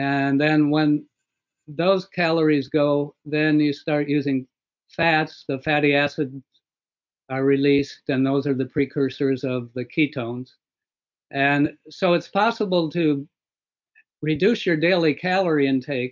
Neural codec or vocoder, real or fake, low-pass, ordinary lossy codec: none; real; 7.2 kHz; MP3, 64 kbps